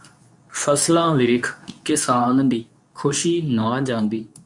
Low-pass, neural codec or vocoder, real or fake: 10.8 kHz; codec, 24 kHz, 0.9 kbps, WavTokenizer, medium speech release version 2; fake